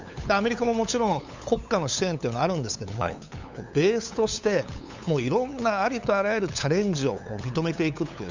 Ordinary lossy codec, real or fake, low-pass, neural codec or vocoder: Opus, 64 kbps; fake; 7.2 kHz; codec, 16 kHz, 8 kbps, FunCodec, trained on LibriTTS, 25 frames a second